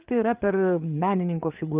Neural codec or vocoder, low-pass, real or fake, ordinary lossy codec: codec, 16 kHz, 8 kbps, FreqCodec, larger model; 3.6 kHz; fake; Opus, 32 kbps